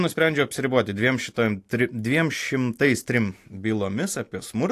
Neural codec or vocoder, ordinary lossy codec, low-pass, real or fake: none; AAC, 64 kbps; 14.4 kHz; real